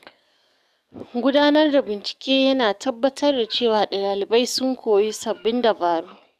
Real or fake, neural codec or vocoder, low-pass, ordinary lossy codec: fake; codec, 44.1 kHz, 7.8 kbps, DAC; 14.4 kHz; none